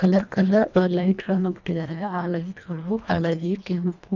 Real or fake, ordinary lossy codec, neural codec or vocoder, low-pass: fake; none; codec, 24 kHz, 1.5 kbps, HILCodec; 7.2 kHz